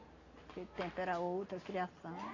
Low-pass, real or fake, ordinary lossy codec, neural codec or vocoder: 7.2 kHz; real; AAC, 32 kbps; none